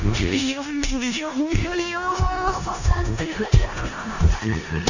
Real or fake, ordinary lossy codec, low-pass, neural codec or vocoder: fake; none; 7.2 kHz; codec, 16 kHz in and 24 kHz out, 0.4 kbps, LongCat-Audio-Codec, four codebook decoder